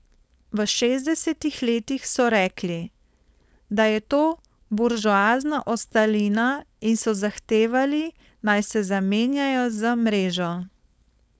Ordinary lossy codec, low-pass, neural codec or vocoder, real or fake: none; none; codec, 16 kHz, 4.8 kbps, FACodec; fake